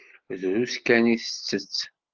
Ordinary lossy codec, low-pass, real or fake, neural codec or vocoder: Opus, 16 kbps; 7.2 kHz; real; none